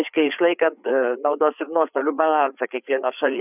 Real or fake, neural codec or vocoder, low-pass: fake; codec, 16 kHz, 4 kbps, FreqCodec, larger model; 3.6 kHz